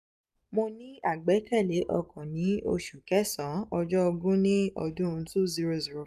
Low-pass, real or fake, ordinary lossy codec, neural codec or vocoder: 14.4 kHz; fake; none; vocoder, 44.1 kHz, 128 mel bands every 256 samples, BigVGAN v2